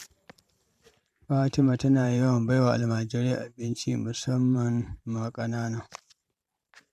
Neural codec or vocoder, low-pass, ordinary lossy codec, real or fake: vocoder, 44.1 kHz, 128 mel bands every 512 samples, BigVGAN v2; 14.4 kHz; MP3, 96 kbps; fake